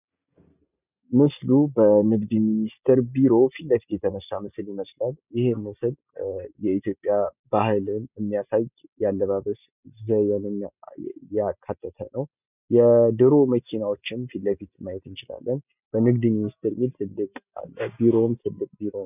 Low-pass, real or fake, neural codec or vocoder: 3.6 kHz; real; none